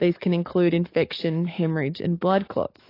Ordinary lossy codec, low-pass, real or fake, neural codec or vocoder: AAC, 32 kbps; 5.4 kHz; fake; codec, 44.1 kHz, 7.8 kbps, DAC